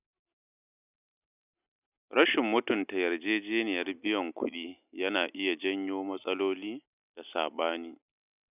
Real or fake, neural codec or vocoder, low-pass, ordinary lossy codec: real; none; 3.6 kHz; none